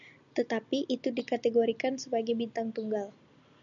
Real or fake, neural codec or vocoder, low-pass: real; none; 7.2 kHz